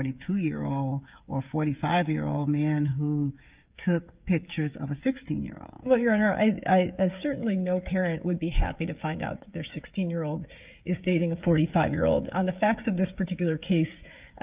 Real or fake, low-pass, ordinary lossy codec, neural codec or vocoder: fake; 3.6 kHz; Opus, 24 kbps; codec, 16 kHz, 16 kbps, FreqCodec, smaller model